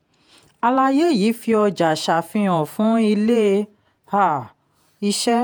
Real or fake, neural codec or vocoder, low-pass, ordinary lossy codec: fake; vocoder, 48 kHz, 128 mel bands, Vocos; none; none